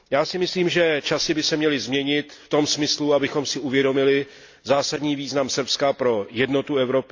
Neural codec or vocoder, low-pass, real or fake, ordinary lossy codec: none; 7.2 kHz; real; AAC, 48 kbps